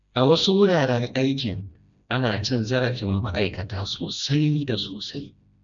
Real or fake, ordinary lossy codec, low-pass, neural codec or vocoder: fake; none; 7.2 kHz; codec, 16 kHz, 1 kbps, FreqCodec, smaller model